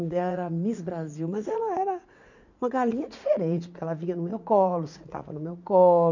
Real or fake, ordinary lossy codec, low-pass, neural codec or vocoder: fake; none; 7.2 kHz; vocoder, 44.1 kHz, 80 mel bands, Vocos